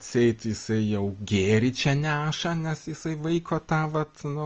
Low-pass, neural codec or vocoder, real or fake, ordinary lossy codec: 7.2 kHz; none; real; Opus, 24 kbps